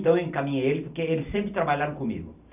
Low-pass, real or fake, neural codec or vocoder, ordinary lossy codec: 3.6 kHz; real; none; none